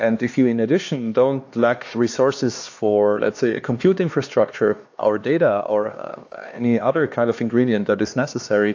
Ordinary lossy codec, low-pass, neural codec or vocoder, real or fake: AAC, 48 kbps; 7.2 kHz; codec, 16 kHz, 2 kbps, X-Codec, HuBERT features, trained on LibriSpeech; fake